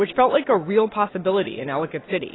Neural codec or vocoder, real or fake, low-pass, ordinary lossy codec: none; real; 7.2 kHz; AAC, 16 kbps